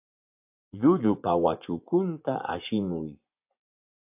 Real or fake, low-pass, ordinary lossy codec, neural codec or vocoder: fake; 3.6 kHz; AAC, 32 kbps; vocoder, 24 kHz, 100 mel bands, Vocos